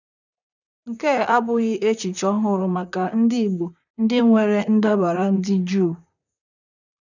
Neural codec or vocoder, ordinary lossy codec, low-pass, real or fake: vocoder, 44.1 kHz, 128 mel bands, Pupu-Vocoder; none; 7.2 kHz; fake